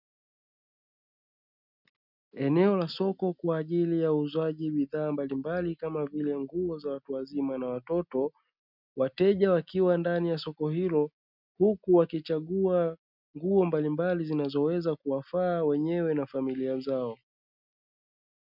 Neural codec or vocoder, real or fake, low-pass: none; real; 5.4 kHz